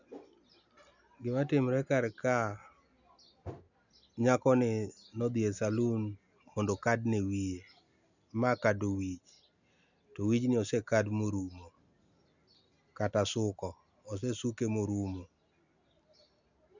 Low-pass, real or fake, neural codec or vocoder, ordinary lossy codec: 7.2 kHz; real; none; none